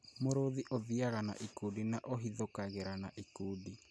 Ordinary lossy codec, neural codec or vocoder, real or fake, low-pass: none; none; real; none